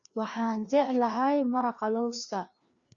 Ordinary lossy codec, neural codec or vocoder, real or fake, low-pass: Opus, 64 kbps; codec, 16 kHz, 2 kbps, FreqCodec, larger model; fake; 7.2 kHz